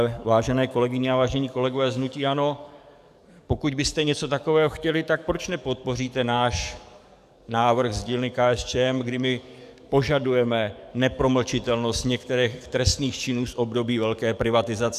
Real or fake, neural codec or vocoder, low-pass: fake; codec, 44.1 kHz, 7.8 kbps, DAC; 14.4 kHz